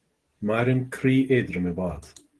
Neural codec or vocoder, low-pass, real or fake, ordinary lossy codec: none; 10.8 kHz; real; Opus, 16 kbps